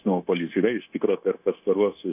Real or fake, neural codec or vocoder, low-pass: fake; codec, 16 kHz, 0.9 kbps, LongCat-Audio-Codec; 3.6 kHz